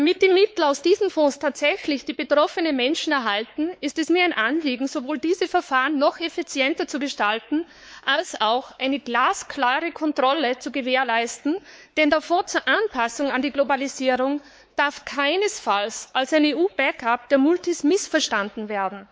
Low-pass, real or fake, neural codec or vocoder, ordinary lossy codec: none; fake; codec, 16 kHz, 4 kbps, X-Codec, WavLM features, trained on Multilingual LibriSpeech; none